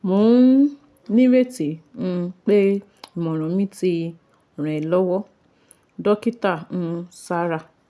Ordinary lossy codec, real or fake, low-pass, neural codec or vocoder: none; real; none; none